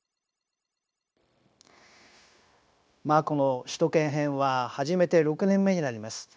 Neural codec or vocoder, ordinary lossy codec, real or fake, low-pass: codec, 16 kHz, 0.9 kbps, LongCat-Audio-Codec; none; fake; none